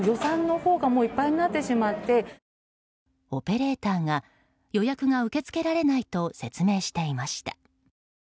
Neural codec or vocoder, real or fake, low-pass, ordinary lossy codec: none; real; none; none